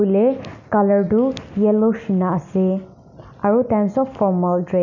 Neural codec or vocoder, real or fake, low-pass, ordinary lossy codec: none; real; 7.2 kHz; none